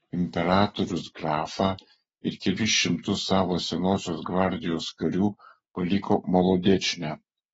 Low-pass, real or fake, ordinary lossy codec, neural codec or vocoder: 19.8 kHz; real; AAC, 24 kbps; none